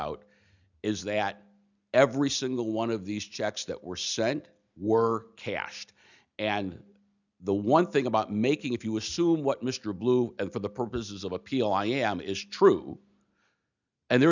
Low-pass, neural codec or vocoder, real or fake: 7.2 kHz; none; real